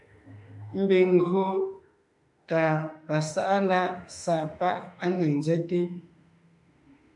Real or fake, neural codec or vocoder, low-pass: fake; autoencoder, 48 kHz, 32 numbers a frame, DAC-VAE, trained on Japanese speech; 10.8 kHz